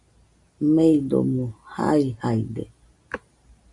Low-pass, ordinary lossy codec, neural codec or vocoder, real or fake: 10.8 kHz; MP3, 64 kbps; none; real